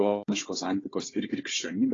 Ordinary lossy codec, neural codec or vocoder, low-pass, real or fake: AAC, 32 kbps; codec, 16 kHz, 4 kbps, FunCodec, trained on Chinese and English, 50 frames a second; 7.2 kHz; fake